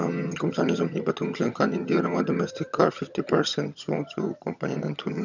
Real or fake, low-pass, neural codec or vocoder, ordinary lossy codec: fake; 7.2 kHz; vocoder, 22.05 kHz, 80 mel bands, HiFi-GAN; none